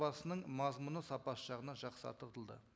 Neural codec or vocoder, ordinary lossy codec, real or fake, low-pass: none; none; real; none